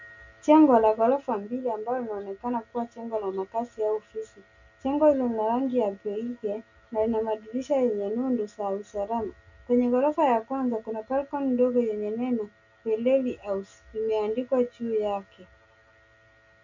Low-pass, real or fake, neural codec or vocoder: 7.2 kHz; real; none